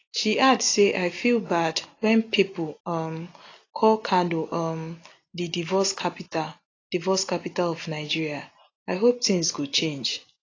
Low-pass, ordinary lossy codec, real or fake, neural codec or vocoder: 7.2 kHz; AAC, 32 kbps; real; none